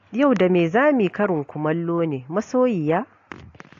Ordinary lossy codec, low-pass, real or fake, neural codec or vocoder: AAC, 48 kbps; 7.2 kHz; real; none